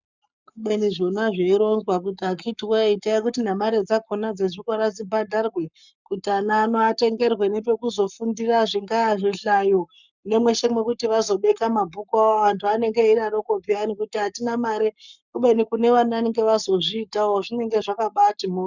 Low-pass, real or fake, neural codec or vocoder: 7.2 kHz; fake; codec, 44.1 kHz, 7.8 kbps, Pupu-Codec